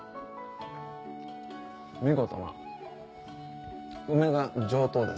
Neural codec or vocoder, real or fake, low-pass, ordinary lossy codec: none; real; none; none